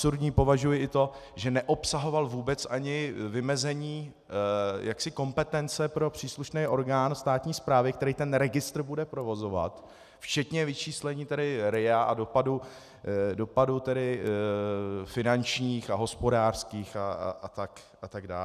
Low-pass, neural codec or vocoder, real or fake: 14.4 kHz; none; real